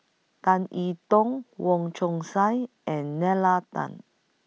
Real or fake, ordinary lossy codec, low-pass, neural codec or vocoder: real; none; none; none